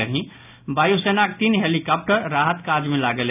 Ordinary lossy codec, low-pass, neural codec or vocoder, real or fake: none; 3.6 kHz; none; real